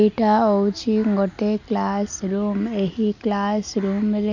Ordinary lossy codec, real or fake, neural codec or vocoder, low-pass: none; real; none; 7.2 kHz